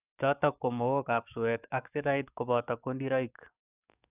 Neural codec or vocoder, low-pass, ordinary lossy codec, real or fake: codec, 44.1 kHz, 7.8 kbps, DAC; 3.6 kHz; none; fake